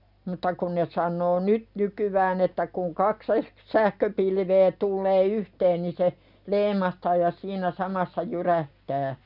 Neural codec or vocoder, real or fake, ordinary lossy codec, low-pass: none; real; none; 5.4 kHz